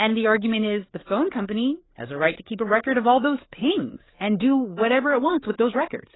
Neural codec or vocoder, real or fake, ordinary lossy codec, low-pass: codec, 16 kHz, 4 kbps, FreqCodec, larger model; fake; AAC, 16 kbps; 7.2 kHz